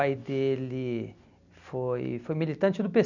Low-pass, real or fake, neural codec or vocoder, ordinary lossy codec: 7.2 kHz; real; none; none